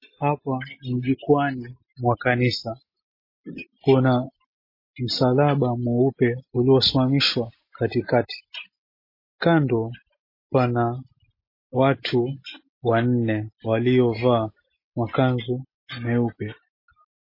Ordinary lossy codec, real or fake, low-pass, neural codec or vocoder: MP3, 24 kbps; real; 5.4 kHz; none